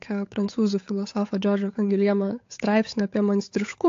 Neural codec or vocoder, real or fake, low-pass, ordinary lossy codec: codec, 16 kHz, 8 kbps, FunCodec, trained on Chinese and English, 25 frames a second; fake; 7.2 kHz; AAC, 48 kbps